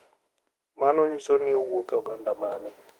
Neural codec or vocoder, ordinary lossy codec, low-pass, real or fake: autoencoder, 48 kHz, 32 numbers a frame, DAC-VAE, trained on Japanese speech; Opus, 24 kbps; 19.8 kHz; fake